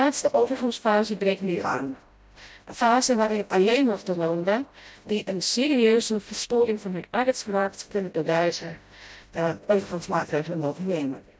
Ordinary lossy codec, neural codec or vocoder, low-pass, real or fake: none; codec, 16 kHz, 0.5 kbps, FreqCodec, smaller model; none; fake